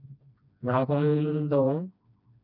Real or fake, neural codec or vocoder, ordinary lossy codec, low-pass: fake; codec, 16 kHz, 1 kbps, FreqCodec, smaller model; none; 5.4 kHz